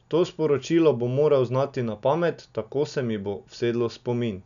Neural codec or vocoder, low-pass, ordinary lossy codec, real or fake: none; 7.2 kHz; none; real